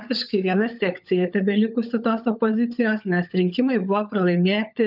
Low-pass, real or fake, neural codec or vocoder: 5.4 kHz; fake; codec, 16 kHz, 16 kbps, FunCodec, trained on LibriTTS, 50 frames a second